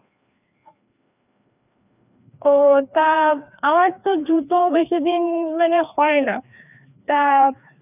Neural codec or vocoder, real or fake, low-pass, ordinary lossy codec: codec, 16 kHz, 2 kbps, FreqCodec, larger model; fake; 3.6 kHz; none